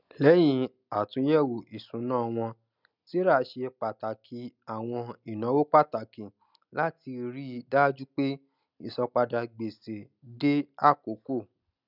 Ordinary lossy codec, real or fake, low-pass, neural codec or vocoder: none; real; 5.4 kHz; none